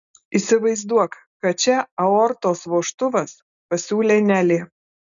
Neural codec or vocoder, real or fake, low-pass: none; real; 7.2 kHz